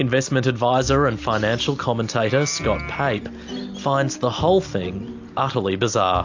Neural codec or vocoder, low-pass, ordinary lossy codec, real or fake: none; 7.2 kHz; AAC, 48 kbps; real